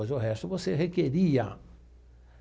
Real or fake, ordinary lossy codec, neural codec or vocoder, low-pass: real; none; none; none